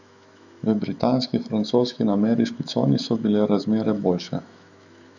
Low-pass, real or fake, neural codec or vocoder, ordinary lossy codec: 7.2 kHz; fake; autoencoder, 48 kHz, 128 numbers a frame, DAC-VAE, trained on Japanese speech; none